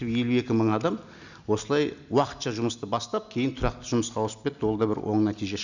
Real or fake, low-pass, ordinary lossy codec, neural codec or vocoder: real; 7.2 kHz; none; none